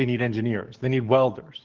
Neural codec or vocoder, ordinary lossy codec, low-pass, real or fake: codec, 44.1 kHz, 7.8 kbps, DAC; Opus, 16 kbps; 7.2 kHz; fake